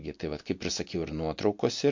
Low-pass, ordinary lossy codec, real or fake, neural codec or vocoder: 7.2 kHz; MP3, 48 kbps; real; none